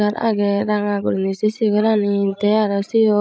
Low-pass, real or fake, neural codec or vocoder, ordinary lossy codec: none; fake; codec, 16 kHz, 16 kbps, FreqCodec, larger model; none